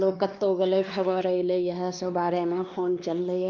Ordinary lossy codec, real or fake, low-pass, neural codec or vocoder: Opus, 32 kbps; fake; 7.2 kHz; codec, 16 kHz, 2 kbps, X-Codec, WavLM features, trained on Multilingual LibriSpeech